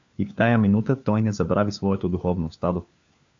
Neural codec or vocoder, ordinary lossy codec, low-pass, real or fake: codec, 16 kHz, 4 kbps, FunCodec, trained on LibriTTS, 50 frames a second; AAC, 64 kbps; 7.2 kHz; fake